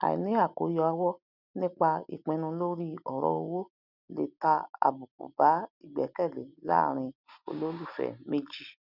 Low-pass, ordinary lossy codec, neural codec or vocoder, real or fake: 5.4 kHz; none; none; real